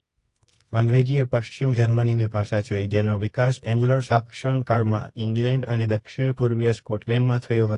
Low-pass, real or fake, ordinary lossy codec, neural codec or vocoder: 10.8 kHz; fake; AAC, 48 kbps; codec, 24 kHz, 0.9 kbps, WavTokenizer, medium music audio release